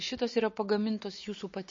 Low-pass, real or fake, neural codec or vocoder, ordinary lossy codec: 7.2 kHz; real; none; MP3, 32 kbps